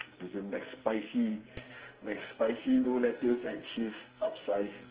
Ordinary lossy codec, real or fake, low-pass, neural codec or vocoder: Opus, 16 kbps; fake; 3.6 kHz; codec, 44.1 kHz, 2.6 kbps, SNAC